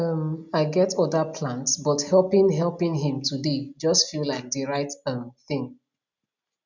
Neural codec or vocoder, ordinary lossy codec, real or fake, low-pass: none; none; real; 7.2 kHz